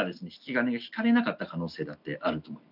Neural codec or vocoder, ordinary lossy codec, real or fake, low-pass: none; none; real; 5.4 kHz